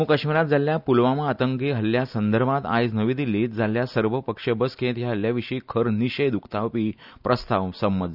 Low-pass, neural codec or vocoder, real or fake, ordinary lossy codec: 5.4 kHz; none; real; none